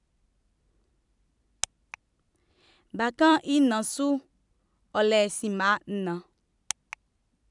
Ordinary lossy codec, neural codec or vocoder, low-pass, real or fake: none; vocoder, 44.1 kHz, 128 mel bands every 256 samples, BigVGAN v2; 10.8 kHz; fake